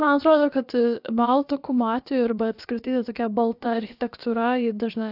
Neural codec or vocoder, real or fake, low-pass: codec, 16 kHz, about 1 kbps, DyCAST, with the encoder's durations; fake; 5.4 kHz